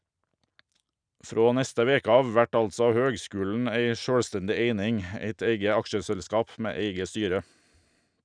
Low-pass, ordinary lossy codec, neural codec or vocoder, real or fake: 9.9 kHz; none; none; real